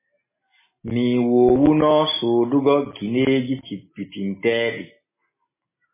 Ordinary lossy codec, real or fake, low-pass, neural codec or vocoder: MP3, 16 kbps; real; 3.6 kHz; none